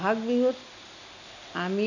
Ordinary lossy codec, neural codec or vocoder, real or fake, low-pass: none; none; real; 7.2 kHz